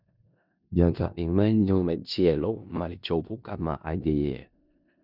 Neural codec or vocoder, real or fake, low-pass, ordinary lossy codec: codec, 16 kHz in and 24 kHz out, 0.4 kbps, LongCat-Audio-Codec, four codebook decoder; fake; 5.4 kHz; none